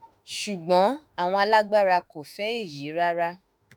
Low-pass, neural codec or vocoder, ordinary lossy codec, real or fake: none; autoencoder, 48 kHz, 32 numbers a frame, DAC-VAE, trained on Japanese speech; none; fake